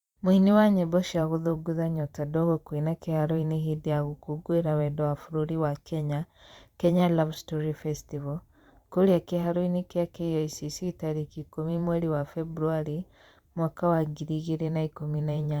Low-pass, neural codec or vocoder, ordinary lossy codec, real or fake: 19.8 kHz; vocoder, 44.1 kHz, 128 mel bands every 512 samples, BigVGAN v2; Opus, 64 kbps; fake